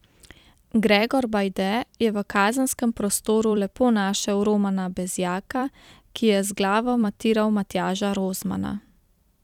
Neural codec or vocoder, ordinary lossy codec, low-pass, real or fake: vocoder, 44.1 kHz, 128 mel bands every 256 samples, BigVGAN v2; none; 19.8 kHz; fake